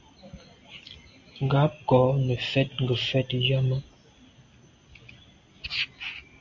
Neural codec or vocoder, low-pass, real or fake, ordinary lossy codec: none; 7.2 kHz; real; AAC, 48 kbps